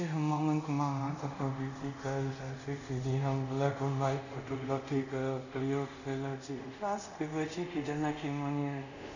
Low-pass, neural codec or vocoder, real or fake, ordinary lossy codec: 7.2 kHz; codec, 24 kHz, 0.5 kbps, DualCodec; fake; none